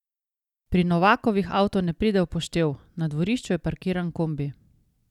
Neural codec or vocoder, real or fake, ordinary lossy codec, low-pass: none; real; none; 19.8 kHz